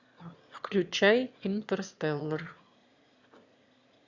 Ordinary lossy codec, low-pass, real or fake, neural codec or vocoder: Opus, 64 kbps; 7.2 kHz; fake; autoencoder, 22.05 kHz, a latent of 192 numbers a frame, VITS, trained on one speaker